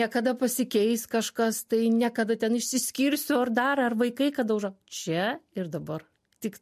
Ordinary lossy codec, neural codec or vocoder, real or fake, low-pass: MP3, 64 kbps; none; real; 14.4 kHz